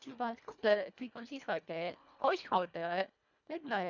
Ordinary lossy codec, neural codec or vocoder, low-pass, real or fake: none; codec, 24 kHz, 1.5 kbps, HILCodec; 7.2 kHz; fake